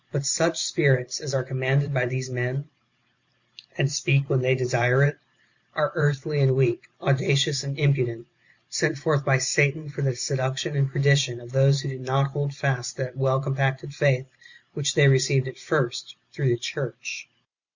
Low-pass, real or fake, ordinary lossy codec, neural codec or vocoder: 7.2 kHz; fake; Opus, 64 kbps; vocoder, 44.1 kHz, 128 mel bands every 512 samples, BigVGAN v2